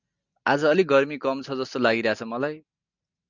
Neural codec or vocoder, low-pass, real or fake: none; 7.2 kHz; real